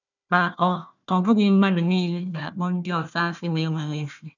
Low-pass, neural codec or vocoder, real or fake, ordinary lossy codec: 7.2 kHz; codec, 16 kHz, 1 kbps, FunCodec, trained on Chinese and English, 50 frames a second; fake; none